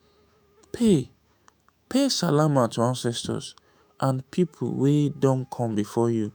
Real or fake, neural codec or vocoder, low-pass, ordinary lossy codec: fake; autoencoder, 48 kHz, 128 numbers a frame, DAC-VAE, trained on Japanese speech; none; none